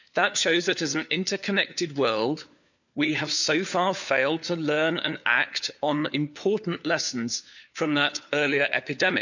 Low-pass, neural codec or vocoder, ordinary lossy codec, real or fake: 7.2 kHz; codec, 16 kHz, 4 kbps, FunCodec, trained on LibriTTS, 50 frames a second; none; fake